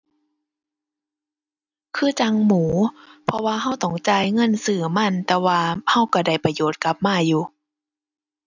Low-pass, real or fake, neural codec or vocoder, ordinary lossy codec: 7.2 kHz; real; none; none